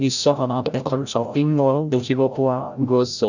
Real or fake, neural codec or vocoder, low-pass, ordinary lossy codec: fake; codec, 16 kHz, 0.5 kbps, FreqCodec, larger model; 7.2 kHz; none